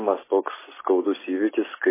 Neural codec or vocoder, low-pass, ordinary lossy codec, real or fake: none; 3.6 kHz; MP3, 16 kbps; real